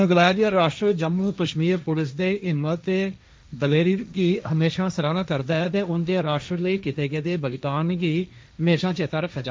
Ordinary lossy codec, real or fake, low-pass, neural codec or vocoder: none; fake; none; codec, 16 kHz, 1.1 kbps, Voila-Tokenizer